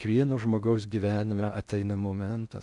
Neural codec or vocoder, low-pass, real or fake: codec, 16 kHz in and 24 kHz out, 0.6 kbps, FocalCodec, streaming, 4096 codes; 10.8 kHz; fake